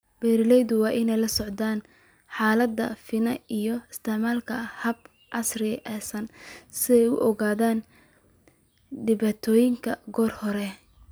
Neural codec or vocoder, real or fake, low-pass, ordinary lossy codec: none; real; none; none